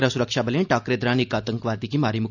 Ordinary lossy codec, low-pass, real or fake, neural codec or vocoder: none; 7.2 kHz; real; none